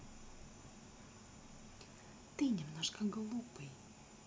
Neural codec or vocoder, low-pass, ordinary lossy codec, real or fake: none; none; none; real